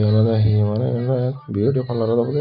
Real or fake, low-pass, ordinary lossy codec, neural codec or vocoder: real; 5.4 kHz; none; none